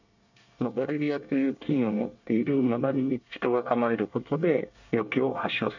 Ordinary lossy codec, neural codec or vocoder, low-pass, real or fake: none; codec, 24 kHz, 1 kbps, SNAC; 7.2 kHz; fake